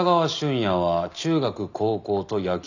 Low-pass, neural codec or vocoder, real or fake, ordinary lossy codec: 7.2 kHz; none; real; none